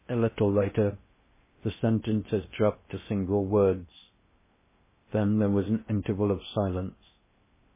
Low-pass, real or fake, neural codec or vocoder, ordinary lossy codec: 3.6 kHz; fake; codec, 16 kHz in and 24 kHz out, 0.6 kbps, FocalCodec, streaming, 2048 codes; MP3, 16 kbps